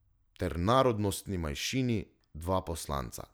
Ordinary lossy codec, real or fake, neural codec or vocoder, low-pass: none; real; none; none